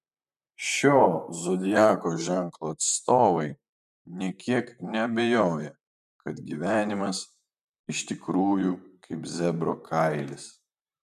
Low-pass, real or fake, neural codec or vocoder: 14.4 kHz; fake; vocoder, 44.1 kHz, 128 mel bands, Pupu-Vocoder